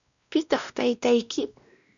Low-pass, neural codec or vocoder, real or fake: 7.2 kHz; codec, 16 kHz, 1 kbps, X-Codec, WavLM features, trained on Multilingual LibriSpeech; fake